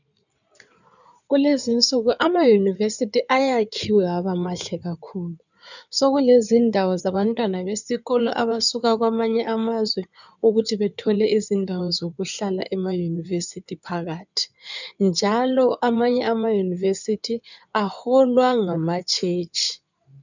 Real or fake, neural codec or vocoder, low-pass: fake; codec, 16 kHz in and 24 kHz out, 2.2 kbps, FireRedTTS-2 codec; 7.2 kHz